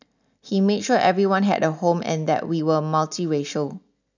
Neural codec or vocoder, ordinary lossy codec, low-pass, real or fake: none; none; 7.2 kHz; real